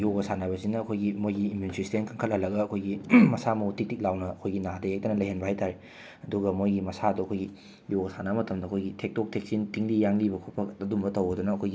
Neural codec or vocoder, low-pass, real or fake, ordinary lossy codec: none; none; real; none